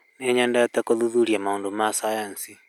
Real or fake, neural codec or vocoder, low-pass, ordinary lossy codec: real; none; 19.8 kHz; none